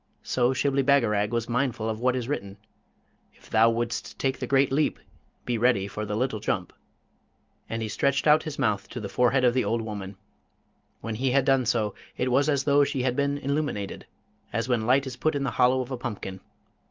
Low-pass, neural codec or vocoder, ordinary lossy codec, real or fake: 7.2 kHz; none; Opus, 24 kbps; real